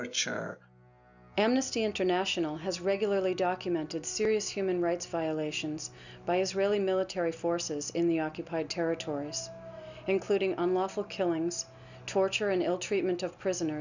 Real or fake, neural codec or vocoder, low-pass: real; none; 7.2 kHz